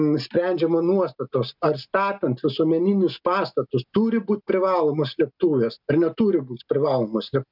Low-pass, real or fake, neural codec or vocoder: 5.4 kHz; real; none